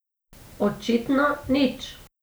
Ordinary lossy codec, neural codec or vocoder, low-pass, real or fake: none; none; none; real